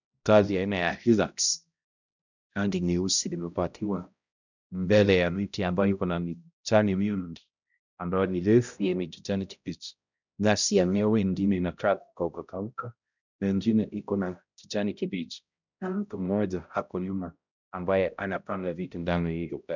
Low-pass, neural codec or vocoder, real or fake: 7.2 kHz; codec, 16 kHz, 0.5 kbps, X-Codec, HuBERT features, trained on balanced general audio; fake